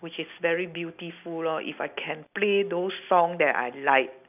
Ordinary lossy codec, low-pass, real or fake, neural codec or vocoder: none; 3.6 kHz; real; none